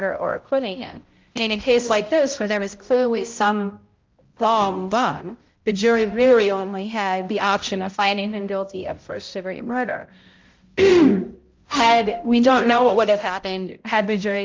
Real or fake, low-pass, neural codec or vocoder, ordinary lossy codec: fake; 7.2 kHz; codec, 16 kHz, 0.5 kbps, X-Codec, HuBERT features, trained on balanced general audio; Opus, 32 kbps